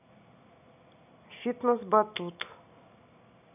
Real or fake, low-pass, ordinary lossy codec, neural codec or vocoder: real; 3.6 kHz; none; none